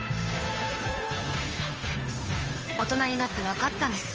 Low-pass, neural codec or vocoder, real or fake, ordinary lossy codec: 7.2 kHz; codec, 16 kHz, 2 kbps, FunCodec, trained on Chinese and English, 25 frames a second; fake; Opus, 24 kbps